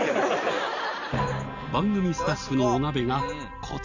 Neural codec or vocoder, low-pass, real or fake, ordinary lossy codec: none; 7.2 kHz; real; none